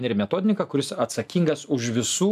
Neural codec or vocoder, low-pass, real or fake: none; 14.4 kHz; real